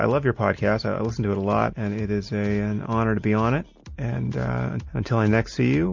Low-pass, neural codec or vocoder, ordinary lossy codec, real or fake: 7.2 kHz; none; MP3, 48 kbps; real